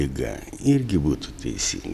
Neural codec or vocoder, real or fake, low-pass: none; real; 14.4 kHz